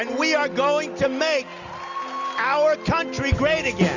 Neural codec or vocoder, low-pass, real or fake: none; 7.2 kHz; real